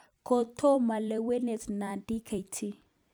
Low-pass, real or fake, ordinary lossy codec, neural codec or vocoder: none; fake; none; vocoder, 44.1 kHz, 128 mel bands every 256 samples, BigVGAN v2